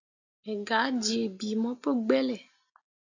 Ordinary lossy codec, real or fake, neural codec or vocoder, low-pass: AAC, 48 kbps; real; none; 7.2 kHz